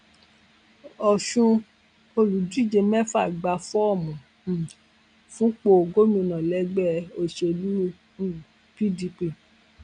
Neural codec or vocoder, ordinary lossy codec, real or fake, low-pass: none; none; real; 9.9 kHz